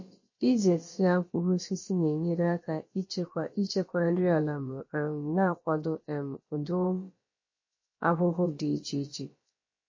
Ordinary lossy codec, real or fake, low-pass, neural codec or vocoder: MP3, 32 kbps; fake; 7.2 kHz; codec, 16 kHz, about 1 kbps, DyCAST, with the encoder's durations